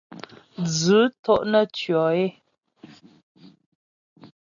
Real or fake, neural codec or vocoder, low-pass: real; none; 7.2 kHz